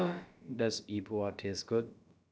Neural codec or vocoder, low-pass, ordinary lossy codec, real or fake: codec, 16 kHz, about 1 kbps, DyCAST, with the encoder's durations; none; none; fake